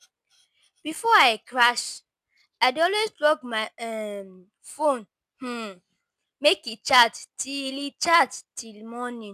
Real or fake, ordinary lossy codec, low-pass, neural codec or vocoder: real; none; 14.4 kHz; none